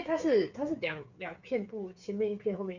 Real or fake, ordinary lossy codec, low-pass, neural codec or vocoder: fake; none; 7.2 kHz; codec, 16 kHz, 8 kbps, FreqCodec, smaller model